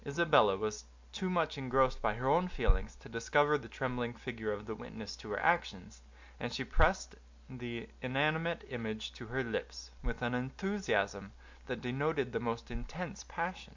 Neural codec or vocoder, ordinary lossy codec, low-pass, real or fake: none; MP3, 64 kbps; 7.2 kHz; real